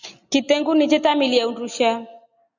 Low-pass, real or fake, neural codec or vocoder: 7.2 kHz; real; none